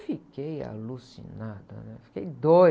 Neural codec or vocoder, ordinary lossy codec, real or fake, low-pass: none; none; real; none